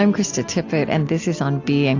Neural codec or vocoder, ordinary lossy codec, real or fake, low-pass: none; AAC, 48 kbps; real; 7.2 kHz